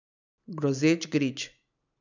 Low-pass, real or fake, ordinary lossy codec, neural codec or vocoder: 7.2 kHz; real; none; none